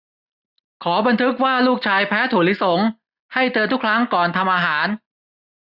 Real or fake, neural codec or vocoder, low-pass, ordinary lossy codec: real; none; 5.4 kHz; none